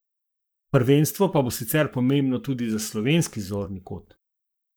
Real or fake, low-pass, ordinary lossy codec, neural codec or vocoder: fake; none; none; codec, 44.1 kHz, 7.8 kbps, Pupu-Codec